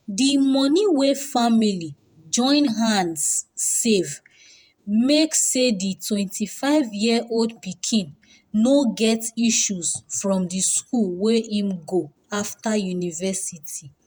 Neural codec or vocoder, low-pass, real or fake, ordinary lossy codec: vocoder, 48 kHz, 128 mel bands, Vocos; none; fake; none